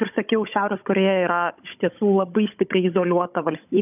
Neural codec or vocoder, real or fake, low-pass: codec, 16 kHz, 16 kbps, FunCodec, trained on Chinese and English, 50 frames a second; fake; 3.6 kHz